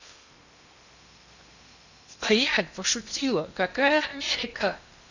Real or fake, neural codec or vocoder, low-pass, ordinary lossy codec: fake; codec, 16 kHz in and 24 kHz out, 0.8 kbps, FocalCodec, streaming, 65536 codes; 7.2 kHz; none